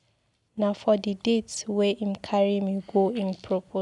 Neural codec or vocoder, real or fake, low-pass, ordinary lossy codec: none; real; 10.8 kHz; none